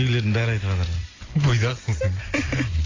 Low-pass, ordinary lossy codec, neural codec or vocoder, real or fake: 7.2 kHz; AAC, 32 kbps; none; real